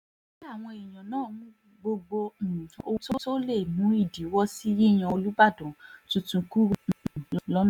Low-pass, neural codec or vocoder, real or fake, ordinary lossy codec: 19.8 kHz; none; real; none